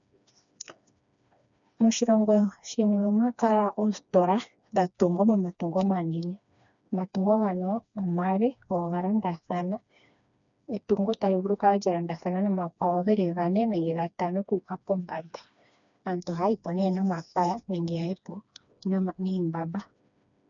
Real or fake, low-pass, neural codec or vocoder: fake; 7.2 kHz; codec, 16 kHz, 2 kbps, FreqCodec, smaller model